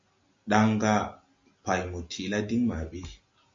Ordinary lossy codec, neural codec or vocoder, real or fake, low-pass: MP3, 48 kbps; none; real; 7.2 kHz